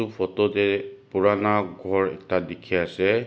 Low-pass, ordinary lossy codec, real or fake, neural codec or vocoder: none; none; real; none